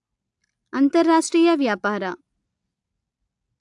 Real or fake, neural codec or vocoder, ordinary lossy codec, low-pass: real; none; AAC, 64 kbps; 10.8 kHz